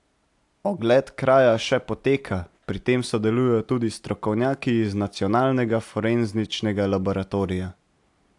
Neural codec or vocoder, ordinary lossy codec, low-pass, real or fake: none; AAC, 64 kbps; 10.8 kHz; real